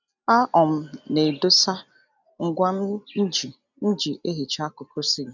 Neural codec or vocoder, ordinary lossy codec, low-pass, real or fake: none; none; 7.2 kHz; real